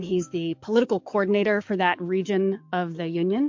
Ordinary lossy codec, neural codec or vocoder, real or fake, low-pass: MP3, 48 kbps; codec, 44.1 kHz, 7.8 kbps, DAC; fake; 7.2 kHz